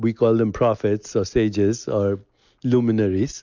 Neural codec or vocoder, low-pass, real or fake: none; 7.2 kHz; real